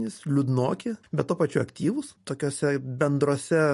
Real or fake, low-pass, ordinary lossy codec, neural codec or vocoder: real; 14.4 kHz; MP3, 48 kbps; none